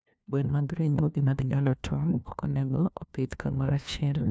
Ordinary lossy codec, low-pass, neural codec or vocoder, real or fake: none; none; codec, 16 kHz, 1 kbps, FunCodec, trained on LibriTTS, 50 frames a second; fake